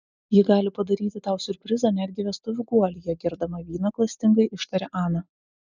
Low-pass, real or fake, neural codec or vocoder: 7.2 kHz; real; none